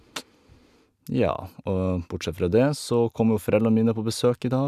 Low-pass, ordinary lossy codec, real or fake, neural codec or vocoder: 14.4 kHz; none; real; none